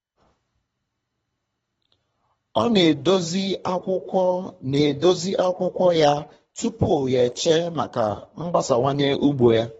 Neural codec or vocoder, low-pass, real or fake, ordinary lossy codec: codec, 24 kHz, 3 kbps, HILCodec; 10.8 kHz; fake; AAC, 24 kbps